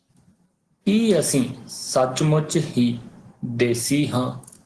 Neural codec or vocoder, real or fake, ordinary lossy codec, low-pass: none; real; Opus, 16 kbps; 10.8 kHz